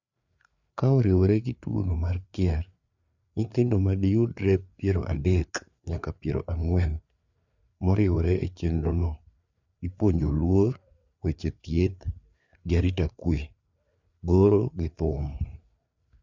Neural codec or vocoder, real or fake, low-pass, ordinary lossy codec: codec, 16 kHz, 4 kbps, FreqCodec, larger model; fake; 7.2 kHz; none